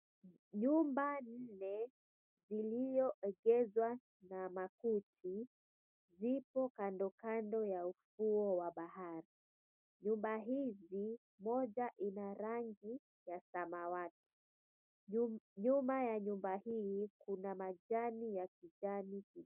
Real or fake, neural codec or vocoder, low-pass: real; none; 3.6 kHz